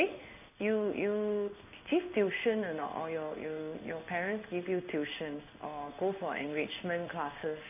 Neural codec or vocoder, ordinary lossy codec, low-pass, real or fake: codec, 16 kHz in and 24 kHz out, 1 kbps, XY-Tokenizer; none; 3.6 kHz; fake